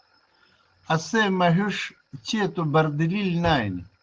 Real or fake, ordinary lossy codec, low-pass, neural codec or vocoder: real; Opus, 16 kbps; 7.2 kHz; none